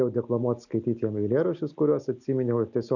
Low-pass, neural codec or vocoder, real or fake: 7.2 kHz; none; real